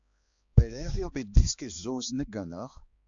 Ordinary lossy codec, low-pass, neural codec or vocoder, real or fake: AAC, 64 kbps; 7.2 kHz; codec, 16 kHz, 2 kbps, X-Codec, HuBERT features, trained on balanced general audio; fake